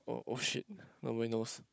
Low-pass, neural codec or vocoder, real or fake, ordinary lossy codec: none; codec, 16 kHz, 16 kbps, FunCodec, trained on Chinese and English, 50 frames a second; fake; none